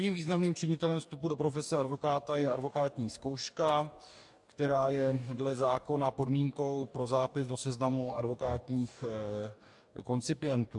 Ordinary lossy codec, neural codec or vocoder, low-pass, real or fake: AAC, 64 kbps; codec, 44.1 kHz, 2.6 kbps, DAC; 10.8 kHz; fake